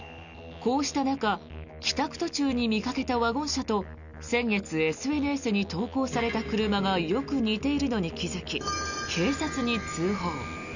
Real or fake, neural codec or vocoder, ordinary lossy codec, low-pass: real; none; none; 7.2 kHz